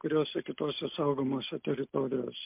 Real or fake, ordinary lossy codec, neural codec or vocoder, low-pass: real; MP3, 32 kbps; none; 3.6 kHz